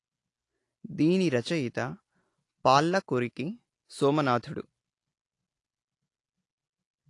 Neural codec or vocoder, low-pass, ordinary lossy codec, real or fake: none; 10.8 kHz; AAC, 48 kbps; real